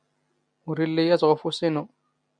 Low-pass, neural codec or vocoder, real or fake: 9.9 kHz; none; real